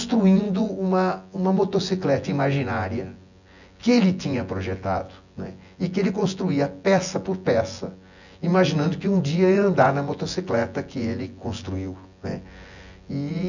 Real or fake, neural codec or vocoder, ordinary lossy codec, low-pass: fake; vocoder, 24 kHz, 100 mel bands, Vocos; none; 7.2 kHz